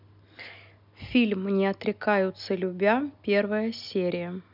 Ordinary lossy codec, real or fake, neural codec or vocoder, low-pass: none; real; none; 5.4 kHz